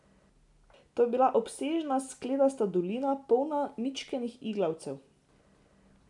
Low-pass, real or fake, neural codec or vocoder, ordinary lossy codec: 10.8 kHz; real; none; none